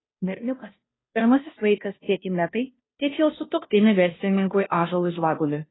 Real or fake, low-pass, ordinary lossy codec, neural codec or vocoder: fake; 7.2 kHz; AAC, 16 kbps; codec, 16 kHz, 0.5 kbps, FunCodec, trained on Chinese and English, 25 frames a second